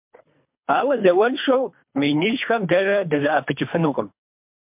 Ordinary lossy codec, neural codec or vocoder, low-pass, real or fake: MP3, 32 kbps; codec, 24 kHz, 3 kbps, HILCodec; 3.6 kHz; fake